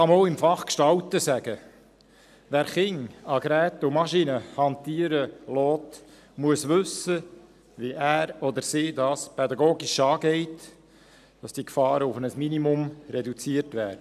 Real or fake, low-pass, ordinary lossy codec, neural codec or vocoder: real; 14.4 kHz; none; none